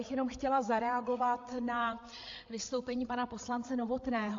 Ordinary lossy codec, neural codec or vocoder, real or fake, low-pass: AAC, 48 kbps; codec, 16 kHz, 8 kbps, FreqCodec, larger model; fake; 7.2 kHz